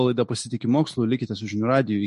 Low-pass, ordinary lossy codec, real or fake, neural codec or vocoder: 14.4 kHz; MP3, 48 kbps; real; none